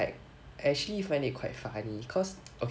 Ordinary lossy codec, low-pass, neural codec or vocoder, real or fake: none; none; none; real